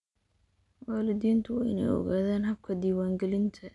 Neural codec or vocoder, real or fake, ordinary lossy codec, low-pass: none; real; none; 10.8 kHz